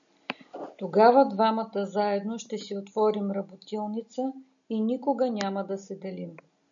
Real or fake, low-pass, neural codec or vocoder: real; 7.2 kHz; none